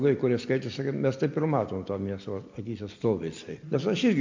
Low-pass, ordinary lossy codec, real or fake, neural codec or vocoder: 7.2 kHz; MP3, 48 kbps; real; none